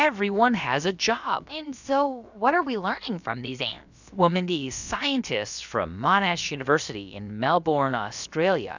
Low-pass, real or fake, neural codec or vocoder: 7.2 kHz; fake; codec, 16 kHz, about 1 kbps, DyCAST, with the encoder's durations